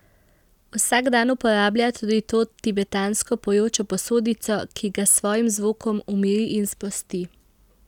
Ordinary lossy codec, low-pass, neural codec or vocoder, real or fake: none; 19.8 kHz; none; real